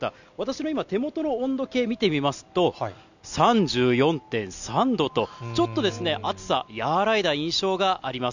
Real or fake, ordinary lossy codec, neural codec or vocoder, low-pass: real; none; none; 7.2 kHz